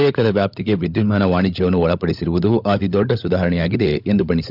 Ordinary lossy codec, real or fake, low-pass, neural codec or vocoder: none; fake; 5.4 kHz; codec, 16 kHz, 16 kbps, FunCodec, trained on LibriTTS, 50 frames a second